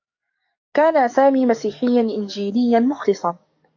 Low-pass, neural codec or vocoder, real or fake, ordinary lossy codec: 7.2 kHz; codec, 44.1 kHz, 7.8 kbps, DAC; fake; AAC, 48 kbps